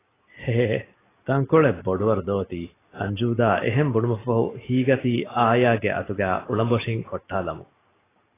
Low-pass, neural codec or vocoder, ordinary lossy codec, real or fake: 3.6 kHz; none; AAC, 16 kbps; real